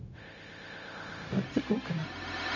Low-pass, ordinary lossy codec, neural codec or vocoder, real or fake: 7.2 kHz; none; codec, 16 kHz, 0.4 kbps, LongCat-Audio-Codec; fake